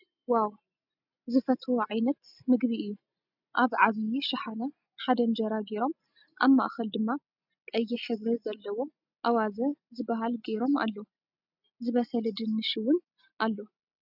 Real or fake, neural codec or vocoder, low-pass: real; none; 5.4 kHz